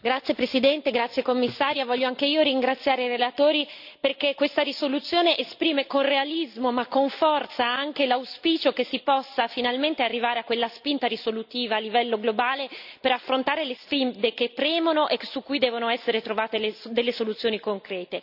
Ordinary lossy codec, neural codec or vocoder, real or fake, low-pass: none; none; real; 5.4 kHz